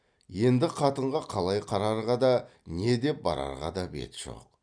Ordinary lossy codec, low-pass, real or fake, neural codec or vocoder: none; 9.9 kHz; real; none